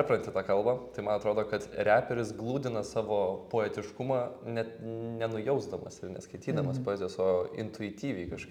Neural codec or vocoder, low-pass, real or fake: none; 19.8 kHz; real